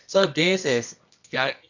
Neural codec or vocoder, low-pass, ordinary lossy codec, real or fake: codec, 24 kHz, 0.9 kbps, WavTokenizer, medium music audio release; 7.2 kHz; none; fake